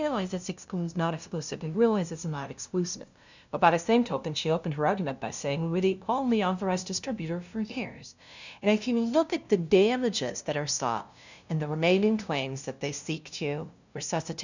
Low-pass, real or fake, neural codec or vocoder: 7.2 kHz; fake; codec, 16 kHz, 0.5 kbps, FunCodec, trained on LibriTTS, 25 frames a second